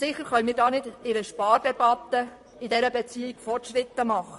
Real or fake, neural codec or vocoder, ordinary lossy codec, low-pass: fake; vocoder, 44.1 kHz, 128 mel bands, Pupu-Vocoder; MP3, 48 kbps; 14.4 kHz